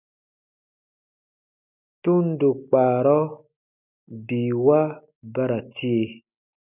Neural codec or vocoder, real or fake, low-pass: none; real; 3.6 kHz